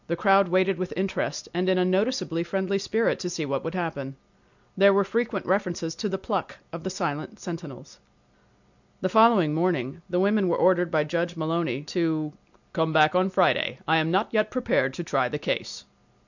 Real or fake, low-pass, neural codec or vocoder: real; 7.2 kHz; none